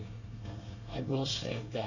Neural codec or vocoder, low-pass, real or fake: codec, 24 kHz, 1 kbps, SNAC; 7.2 kHz; fake